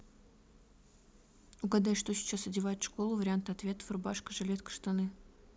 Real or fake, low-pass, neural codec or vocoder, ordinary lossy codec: real; none; none; none